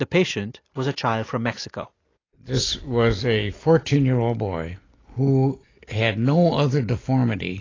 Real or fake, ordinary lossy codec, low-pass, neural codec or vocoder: real; AAC, 32 kbps; 7.2 kHz; none